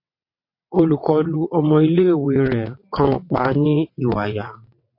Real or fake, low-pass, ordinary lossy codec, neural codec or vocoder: fake; 5.4 kHz; MP3, 32 kbps; vocoder, 22.05 kHz, 80 mel bands, WaveNeXt